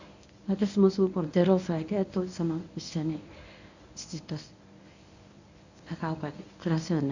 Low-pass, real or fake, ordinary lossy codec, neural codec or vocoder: 7.2 kHz; fake; none; codec, 24 kHz, 0.9 kbps, WavTokenizer, medium speech release version 1